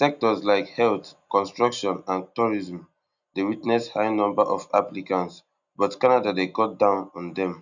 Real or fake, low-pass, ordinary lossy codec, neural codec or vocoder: real; 7.2 kHz; none; none